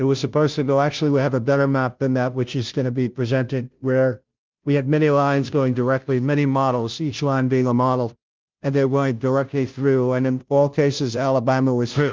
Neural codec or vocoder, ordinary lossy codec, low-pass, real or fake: codec, 16 kHz, 0.5 kbps, FunCodec, trained on Chinese and English, 25 frames a second; Opus, 24 kbps; 7.2 kHz; fake